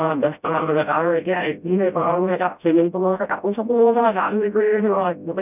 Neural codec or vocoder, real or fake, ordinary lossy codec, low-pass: codec, 16 kHz, 0.5 kbps, FreqCodec, smaller model; fake; none; 3.6 kHz